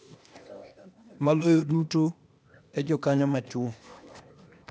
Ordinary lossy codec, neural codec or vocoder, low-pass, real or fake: none; codec, 16 kHz, 0.8 kbps, ZipCodec; none; fake